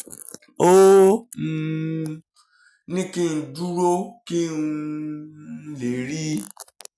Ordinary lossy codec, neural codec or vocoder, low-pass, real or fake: none; none; none; real